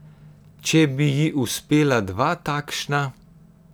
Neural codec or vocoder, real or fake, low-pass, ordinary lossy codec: none; real; none; none